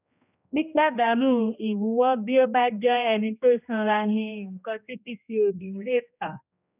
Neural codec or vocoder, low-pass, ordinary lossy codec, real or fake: codec, 16 kHz, 1 kbps, X-Codec, HuBERT features, trained on general audio; 3.6 kHz; none; fake